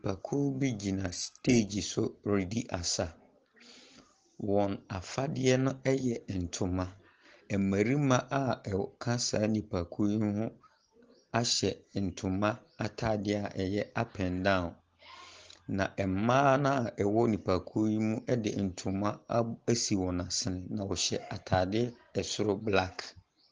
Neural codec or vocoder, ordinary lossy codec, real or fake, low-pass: none; Opus, 16 kbps; real; 7.2 kHz